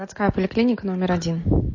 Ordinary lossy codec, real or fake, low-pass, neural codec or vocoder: MP3, 32 kbps; real; 7.2 kHz; none